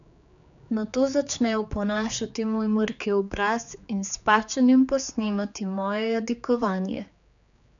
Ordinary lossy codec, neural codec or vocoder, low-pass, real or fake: none; codec, 16 kHz, 4 kbps, X-Codec, HuBERT features, trained on general audio; 7.2 kHz; fake